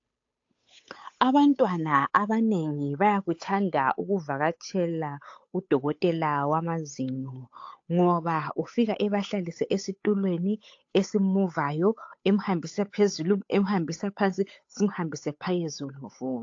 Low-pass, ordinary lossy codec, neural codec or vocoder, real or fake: 7.2 kHz; AAC, 48 kbps; codec, 16 kHz, 8 kbps, FunCodec, trained on Chinese and English, 25 frames a second; fake